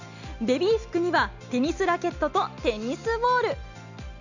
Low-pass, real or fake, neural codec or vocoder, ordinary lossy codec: 7.2 kHz; real; none; none